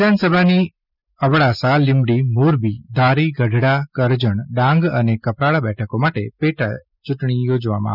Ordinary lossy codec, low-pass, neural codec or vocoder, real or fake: none; 5.4 kHz; none; real